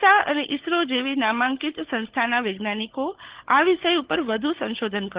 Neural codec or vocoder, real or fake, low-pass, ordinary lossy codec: codec, 16 kHz, 16 kbps, FunCodec, trained on LibriTTS, 50 frames a second; fake; 3.6 kHz; Opus, 16 kbps